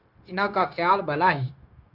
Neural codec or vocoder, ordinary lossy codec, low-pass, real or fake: codec, 16 kHz, 0.9 kbps, LongCat-Audio-Codec; Opus, 64 kbps; 5.4 kHz; fake